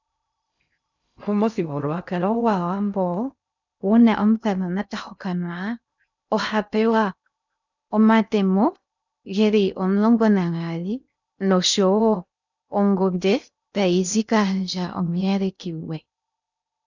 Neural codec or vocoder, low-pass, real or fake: codec, 16 kHz in and 24 kHz out, 0.6 kbps, FocalCodec, streaming, 2048 codes; 7.2 kHz; fake